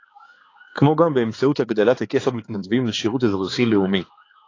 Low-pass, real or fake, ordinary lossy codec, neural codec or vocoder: 7.2 kHz; fake; AAC, 32 kbps; codec, 16 kHz, 4 kbps, X-Codec, HuBERT features, trained on balanced general audio